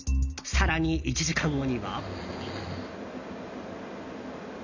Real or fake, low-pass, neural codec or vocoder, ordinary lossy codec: real; 7.2 kHz; none; none